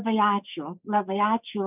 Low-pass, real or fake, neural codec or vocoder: 3.6 kHz; fake; codec, 16 kHz, 6 kbps, DAC